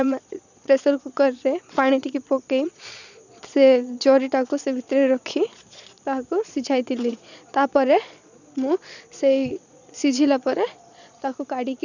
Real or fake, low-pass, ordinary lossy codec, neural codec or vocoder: fake; 7.2 kHz; none; vocoder, 22.05 kHz, 80 mel bands, Vocos